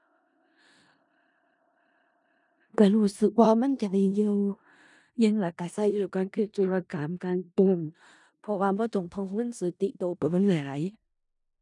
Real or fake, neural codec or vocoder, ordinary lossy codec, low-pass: fake; codec, 16 kHz in and 24 kHz out, 0.4 kbps, LongCat-Audio-Codec, four codebook decoder; none; 10.8 kHz